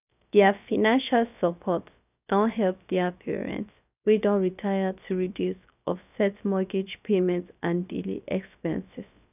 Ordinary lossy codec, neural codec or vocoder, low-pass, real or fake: none; codec, 16 kHz, about 1 kbps, DyCAST, with the encoder's durations; 3.6 kHz; fake